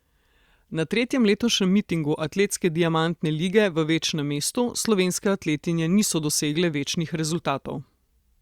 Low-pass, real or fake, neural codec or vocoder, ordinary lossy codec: 19.8 kHz; fake; vocoder, 44.1 kHz, 128 mel bands every 256 samples, BigVGAN v2; Opus, 64 kbps